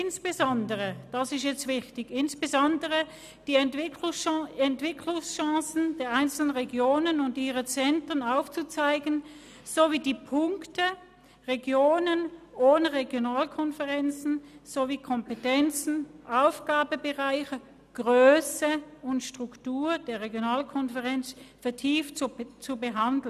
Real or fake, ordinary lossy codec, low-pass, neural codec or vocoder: real; none; 14.4 kHz; none